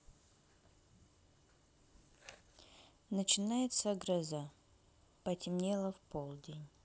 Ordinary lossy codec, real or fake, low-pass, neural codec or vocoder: none; real; none; none